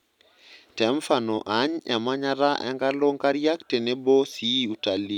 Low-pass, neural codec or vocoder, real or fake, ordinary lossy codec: 19.8 kHz; none; real; none